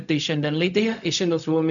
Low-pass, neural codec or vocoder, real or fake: 7.2 kHz; codec, 16 kHz, 0.4 kbps, LongCat-Audio-Codec; fake